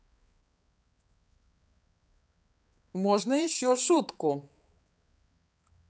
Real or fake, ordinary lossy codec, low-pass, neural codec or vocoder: fake; none; none; codec, 16 kHz, 4 kbps, X-Codec, HuBERT features, trained on balanced general audio